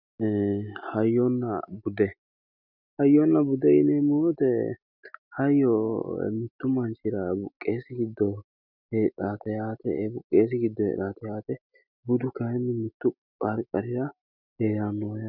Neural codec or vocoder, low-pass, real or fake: none; 5.4 kHz; real